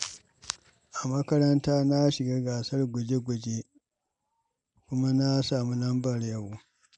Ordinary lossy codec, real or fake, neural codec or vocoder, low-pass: MP3, 96 kbps; real; none; 9.9 kHz